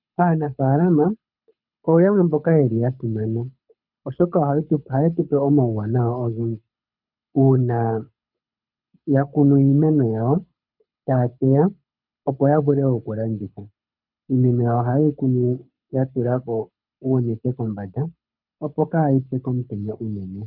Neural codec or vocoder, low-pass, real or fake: codec, 24 kHz, 6 kbps, HILCodec; 5.4 kHz; fake